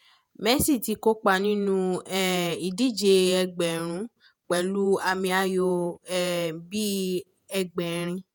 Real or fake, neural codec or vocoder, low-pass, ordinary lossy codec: fake; vocoder, 48 kHz, 128 mel bands, Vocos; none; none